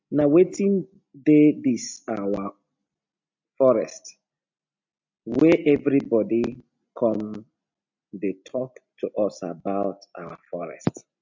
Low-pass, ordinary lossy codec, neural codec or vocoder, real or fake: 7.2 kHz; MP3, 64 kbps; none; real